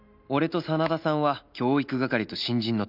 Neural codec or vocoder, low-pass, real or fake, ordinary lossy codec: none; 5.4 kHz; real; none